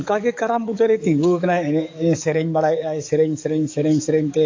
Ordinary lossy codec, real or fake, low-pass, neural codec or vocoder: AAC, 48 kbps; fake; 7.2 kHz; codec, 44.1 kHz, 7.8 kbps, DAC